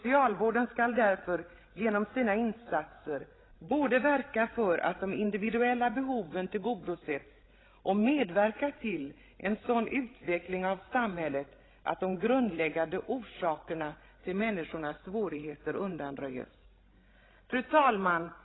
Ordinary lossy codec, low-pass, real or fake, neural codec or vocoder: AAC, 16 kbps; 7.2 kHz; fake; codec, 16 kHz, 16 kbps, FreqCodec, larger model